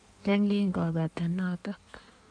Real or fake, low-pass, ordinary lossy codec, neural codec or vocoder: fake; 9.9 kHz; none; codec, 16 kHz in and 24 kHz out, 1.1 kbps, FireRedTTS-2 codec